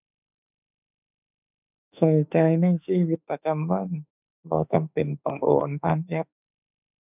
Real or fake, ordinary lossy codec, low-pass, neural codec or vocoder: fake; none; 3.6 kHz; autoencoder, 48 kHz, 32 numbers a frame, DAC-VAE, trained on Japanese speech